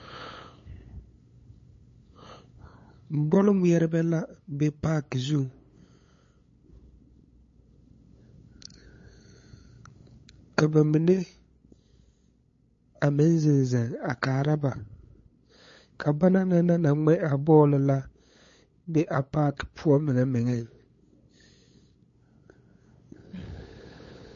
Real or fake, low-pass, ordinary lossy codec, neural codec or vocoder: fake; 7.2 kHz; MP3, 32 kbps; codec, 16 kHz, 8 kbps, FunCodec, trained on LibriTTS, 25 frames a second